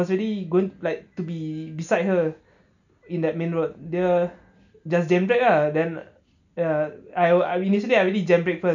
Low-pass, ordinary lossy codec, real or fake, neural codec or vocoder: 7.2 kHz; none; real; none